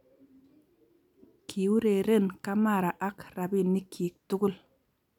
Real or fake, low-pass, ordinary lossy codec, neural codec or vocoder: real; 19.8 kHz; none; none